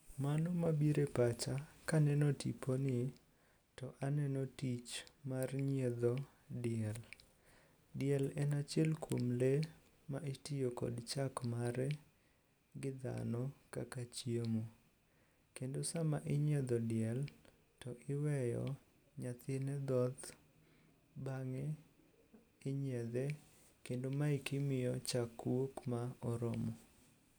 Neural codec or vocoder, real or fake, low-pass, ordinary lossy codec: none; real; none; none